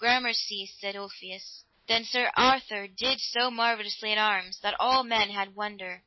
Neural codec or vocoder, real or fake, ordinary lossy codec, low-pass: none; real; MP3, 24 kbps; 7.2 kHz